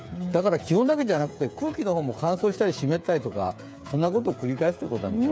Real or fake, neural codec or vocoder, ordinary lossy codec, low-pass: fake; codec, 16 kHz, 8 kbps, FreqCodec, smaller model; none; none